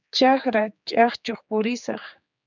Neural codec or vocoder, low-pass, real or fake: codec, 16 kHz, 4 kbps, X-Codec, HuBERT features, trained on general audio; 7.2 kHz; fake